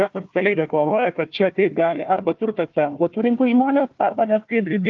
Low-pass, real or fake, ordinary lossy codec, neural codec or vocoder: 7.2 kHz; fake; Opus, 24 kbps; codec, 16 kHz, 1 kbps, FunCodec, trained on Chinese and English, 50 frames a second